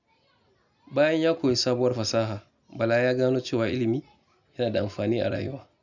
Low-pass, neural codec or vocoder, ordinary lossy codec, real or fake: 7.2 kHz; none; none; real